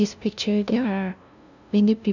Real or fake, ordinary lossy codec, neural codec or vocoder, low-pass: fake; none; codec, 16 kHz, 0.5 kbps, FunCodec, trained on LibriTTS, 25 frames a second; 7.2 kHz